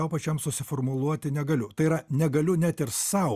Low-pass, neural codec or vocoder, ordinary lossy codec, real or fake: 14.4 kHz; none; Opus, 64 kbps; real